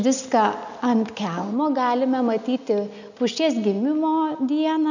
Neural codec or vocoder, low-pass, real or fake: none; 7.2 kHz; real